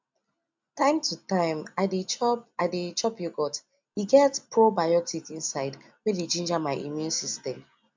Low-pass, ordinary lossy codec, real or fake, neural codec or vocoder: 7.2 kHz; MP3, 64 kbps; real; none